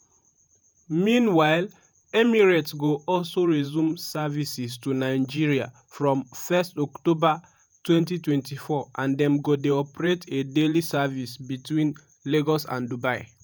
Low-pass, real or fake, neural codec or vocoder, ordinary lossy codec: none; fake; vocoder, 48 kHz, 128 mel bands, Vocos; none